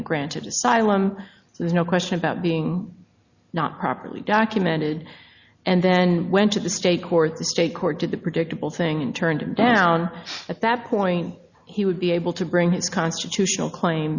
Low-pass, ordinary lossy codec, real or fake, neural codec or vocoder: 7.2 kHz; Opus, 64 kbps; real; none